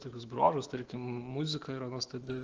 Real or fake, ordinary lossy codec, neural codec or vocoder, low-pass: fake; Opus, 16 kbps; autoencoder, 48 kHz, 128 numbers a frame, DAC-VAE, trained on Japanese speech; 7.2 kHz